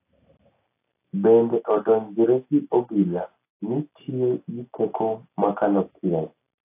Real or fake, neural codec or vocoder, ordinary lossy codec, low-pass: real; none; AAC, 24 kbps; 3.6 kHz